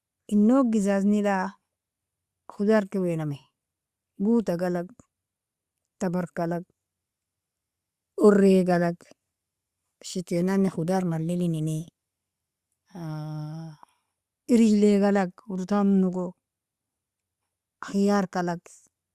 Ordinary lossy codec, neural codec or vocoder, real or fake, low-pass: Opus, 64 kbps; none; real; 14.4 kHz